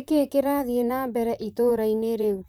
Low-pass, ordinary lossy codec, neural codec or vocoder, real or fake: none; none; vocoder, 44.1 kHz, 128 mel bands, Pupu-Vocoder; fake